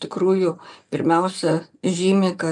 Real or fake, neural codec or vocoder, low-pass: fake; vocoder, 24 kHz, 100 mel bands, Vocos; 10.8 kHz